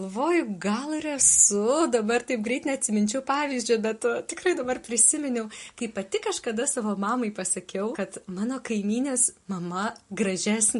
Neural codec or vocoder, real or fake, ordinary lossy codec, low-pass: none; real; MP3, 48 kbps; 14.4 kHz